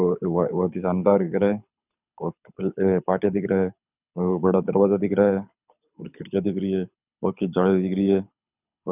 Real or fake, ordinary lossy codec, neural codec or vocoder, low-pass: fake; none; codec, 24 kHz, 6 kbps, HILCodec; 3.6 kHz